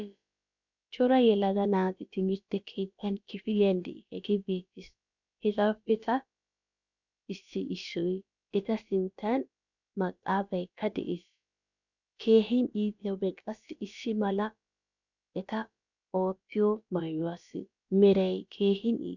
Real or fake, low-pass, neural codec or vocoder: fake; 7.2 kHz; codec, 16 kHz, about 1 kbps, DyCAST, with the encoder's durations